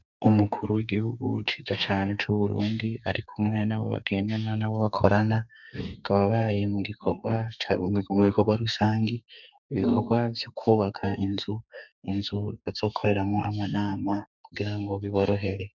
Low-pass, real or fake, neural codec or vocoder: 7.2 kHz; fake; codec, 32 kHz, 1.9 kbps, SNAC